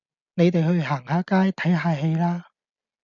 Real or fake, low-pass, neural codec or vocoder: real; 7.2 kHz; none